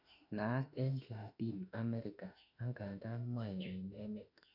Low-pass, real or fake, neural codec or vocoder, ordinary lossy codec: 5.4 kHz; fake; autoencoder, 48 kHz, 32 numbers a frame, DAC-VAE, trained on Japanese speech; none